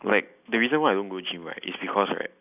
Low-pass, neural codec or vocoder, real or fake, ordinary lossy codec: 3.6 kHz; none; real; none